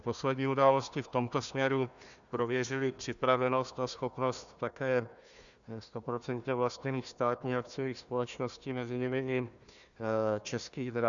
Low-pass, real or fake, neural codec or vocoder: 7.2 kHz; fake; codec, 16 kHz, 1 kbps, FunCodec, trained on Chinese and English, 50 frames a second